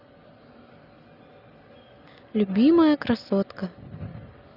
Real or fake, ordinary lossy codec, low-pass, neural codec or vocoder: real; none; 5.4 kHz; none